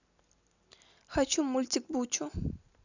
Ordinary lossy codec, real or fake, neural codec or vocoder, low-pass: none; real; none; 7.2 kHz